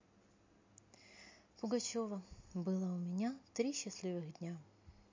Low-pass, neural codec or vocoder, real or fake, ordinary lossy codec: 7.2 kHz; none; real; MP3, 48 kbps